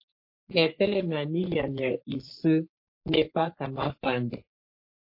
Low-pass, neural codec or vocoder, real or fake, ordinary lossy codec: 5.4 kHz; codec, 44.1 kHz, 3.4 kbps, Pupu-Codec; fake; MP3, 32 kbps